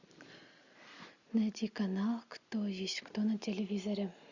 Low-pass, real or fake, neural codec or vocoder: 7.2 kHz; real; none